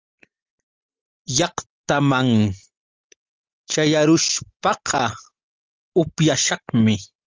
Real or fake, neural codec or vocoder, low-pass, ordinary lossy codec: real; none; 7.2 kHz; Opus, 24 kbps